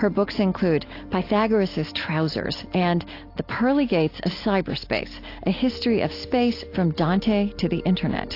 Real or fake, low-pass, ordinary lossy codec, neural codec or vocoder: real; 5.4 kHz; AAC, 32 kbps; none